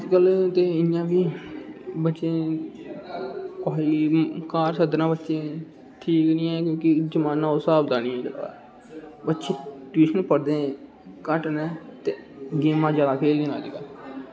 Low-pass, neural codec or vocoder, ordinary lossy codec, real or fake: none; none; none; real